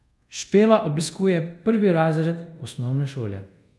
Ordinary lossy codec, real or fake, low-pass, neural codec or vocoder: none; fake; none; codec, 24 kHz, 0.5 kbps, DualCodec